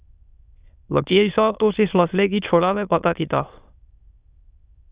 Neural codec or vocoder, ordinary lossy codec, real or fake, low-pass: autoencoder, 22.05 kHz, a latent of 192 numbers a frame, VITS, trained on many speakers; Opus, 64 kbps; fake; 3.6 kHz